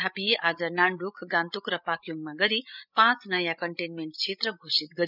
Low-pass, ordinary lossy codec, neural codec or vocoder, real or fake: 5.4 kHz; none; codec, 16 kHz, 16 kbps, FreqCodec, larger model; fake